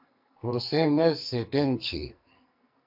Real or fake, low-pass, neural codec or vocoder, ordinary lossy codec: fake; 5.4 kHz; codec, 44.1 kHz, 2.6 kbps, SNAC; MP3, 48 kbps